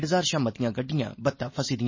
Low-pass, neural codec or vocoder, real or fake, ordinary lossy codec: 7.2 kHz; none; real; MP3, 32 kbps